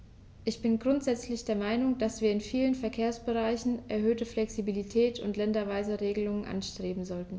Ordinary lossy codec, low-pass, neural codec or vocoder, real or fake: none; none; none; real